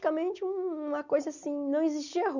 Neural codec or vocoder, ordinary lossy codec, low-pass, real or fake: none; none; 7.2 kHz; real